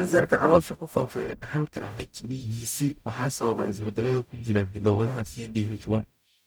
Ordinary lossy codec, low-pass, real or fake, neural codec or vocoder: none; none; fake; codec, 44.1 kHz, 0.9 kbps, DAC